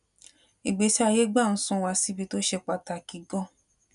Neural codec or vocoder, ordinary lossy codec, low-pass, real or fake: none; none; 10.8 kHz; real